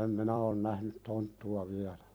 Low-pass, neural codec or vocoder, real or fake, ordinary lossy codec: none; vocoder, 44.1 kHz, 128 mel bands every 256 samples, BigVGAN v2; fake; none